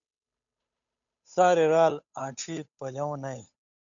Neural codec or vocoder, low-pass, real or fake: codec, 16 kHz, 8 kbps, FunCodec, trained on Chinese and English, 25 frames a second; 7.2 kHz; fake